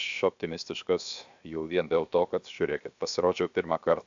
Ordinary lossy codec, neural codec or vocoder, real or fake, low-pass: AAC, 64 kbps; codec, 16 kHz, 0.7 kbps, FocalCodec; fake; 7.2 kHz